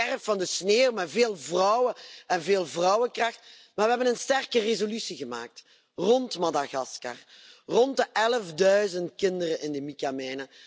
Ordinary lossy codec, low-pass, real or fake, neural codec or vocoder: none; none; real; none